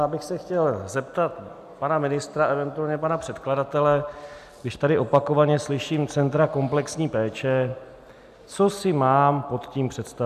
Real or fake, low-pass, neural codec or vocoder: real; 14.4 kHz; none